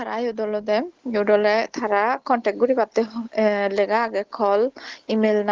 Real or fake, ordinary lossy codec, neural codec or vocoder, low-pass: real; Opus, 16 kbps; none; 7.2 kHz